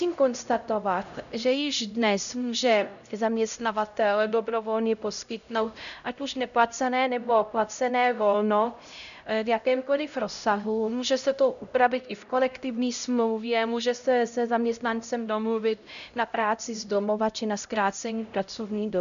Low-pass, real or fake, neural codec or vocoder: 7.2 kHz; fake; codec, 16 kHz, 0.5 kbps, X-Codec, HuBERT features, trained on LibriSpeech